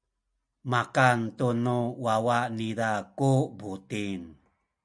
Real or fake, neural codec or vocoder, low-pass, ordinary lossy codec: real; none; 9.9 kHz; MP3, 96 kbps